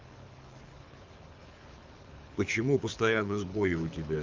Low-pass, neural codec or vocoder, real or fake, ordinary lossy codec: 7.2 kHz; codec, 24 kHz, 6 kbps, HILCodec; fake; Opus, 32 kbps